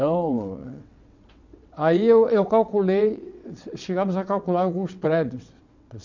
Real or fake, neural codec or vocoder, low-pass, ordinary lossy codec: fake; vocoder, 22.05 kHz, 80 mel bands, WaveNeXt; 7.2 kHz; none